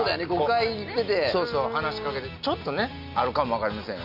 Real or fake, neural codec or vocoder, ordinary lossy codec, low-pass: real; none; none; 5.4 kHz